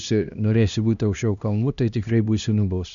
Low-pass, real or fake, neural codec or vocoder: 7.2 kHz; fake; codec, 16 kHz, 1 kbps, X-Codec, HuBERT features, trained on LibriSpeech